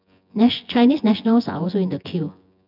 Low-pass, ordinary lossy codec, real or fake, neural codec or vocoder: 5.4 kHz; none; fake; vocoder, 24 kHz, 100 mel bands, Vocos